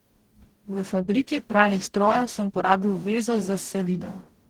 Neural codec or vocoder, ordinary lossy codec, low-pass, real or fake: codec, 44.1 kHz, 0.9 kbps, DAC; Opus, 16 kbps; 19.8 kHz; fake